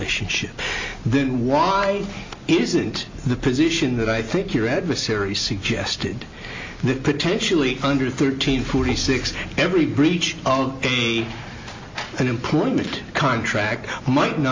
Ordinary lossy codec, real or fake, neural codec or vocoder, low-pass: MP3, 48 kbps; real; none; 7.2 kHz